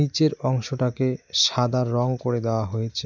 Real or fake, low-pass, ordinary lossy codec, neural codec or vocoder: real; 7.2 kHz; MP3, 64 kbps; none